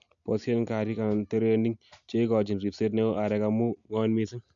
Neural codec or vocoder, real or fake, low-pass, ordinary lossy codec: none; real; 7.2 kHz; AAC, 64 kbps